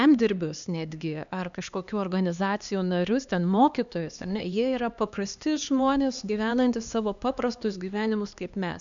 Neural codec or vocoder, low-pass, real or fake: codec, 16 kHz, 2 kbps, X-Codec, HuBERT features, trained on LibriSpeech; 7.2 kHz; fake